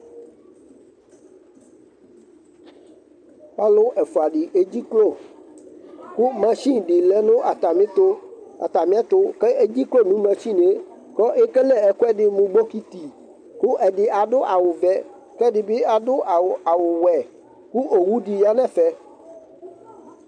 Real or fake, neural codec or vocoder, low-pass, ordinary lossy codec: real; none; 9.9 kHz; MP3, 64 kbps